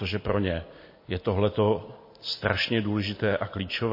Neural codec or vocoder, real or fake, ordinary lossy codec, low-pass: none; real; MP3, 24 kbps; 5.4 kHz